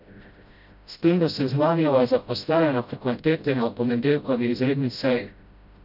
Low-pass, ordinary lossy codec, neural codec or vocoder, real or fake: 5.4 kHz; none; codec, 16 kHz, 0.5 kbps, FreqCodec, smaller model; fake